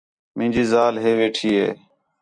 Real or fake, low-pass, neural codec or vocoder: real; 9.9 kHz; none